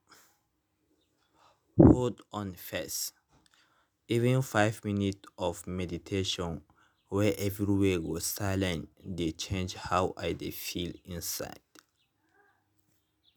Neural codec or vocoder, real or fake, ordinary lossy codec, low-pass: none; real; none; none